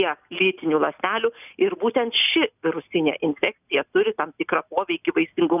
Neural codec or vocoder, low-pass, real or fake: none; 3.6 kHz; real